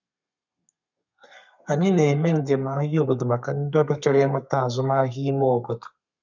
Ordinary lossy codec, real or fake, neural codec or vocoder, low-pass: none; fake; codec, 32 kHz, 1.9 kbps, SNAC; 7.2 kHz